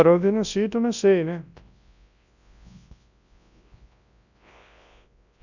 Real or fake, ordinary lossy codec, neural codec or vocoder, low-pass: fake; none; codec, 24 kHz, 0.9 kbps, WavTokenizer, large speech release; 7.2 kHz